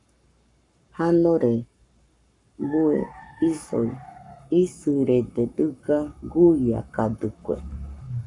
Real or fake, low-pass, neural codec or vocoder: fake; 10.8 kHz; codec, 44.1 kHz, 7.8 kbps, Pupu-Codec